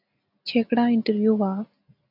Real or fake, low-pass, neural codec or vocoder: real; 5.4 kHz; none